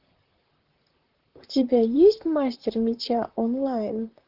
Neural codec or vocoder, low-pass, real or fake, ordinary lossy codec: vocoder, 44.1 kHz, 128 mel bands, Pupu-Vocoder; 5.4 kHz; fake; Opus, 16 kbps